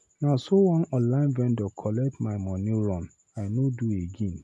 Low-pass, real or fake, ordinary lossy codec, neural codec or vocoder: none; real; none; none